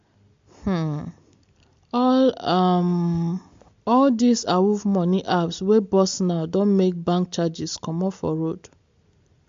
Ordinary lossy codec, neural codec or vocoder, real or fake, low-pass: MP3, 48 kbps; none; real; 7.2 kHz